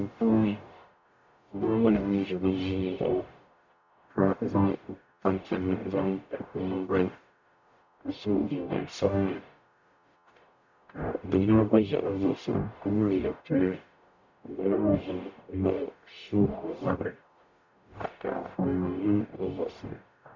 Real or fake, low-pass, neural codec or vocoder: fake; 7.2 kHz; codec, 44.1 kHz, 0.9 kbps, DAC